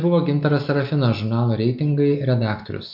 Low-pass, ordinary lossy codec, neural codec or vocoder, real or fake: 5.4 kHz; MP3, 48 kbps; none; real